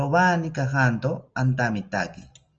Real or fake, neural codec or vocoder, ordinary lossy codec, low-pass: real; none; Opus, 24 kbps; 7.2 kHz